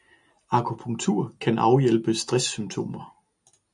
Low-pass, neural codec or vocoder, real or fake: 10.8 kHz; none; real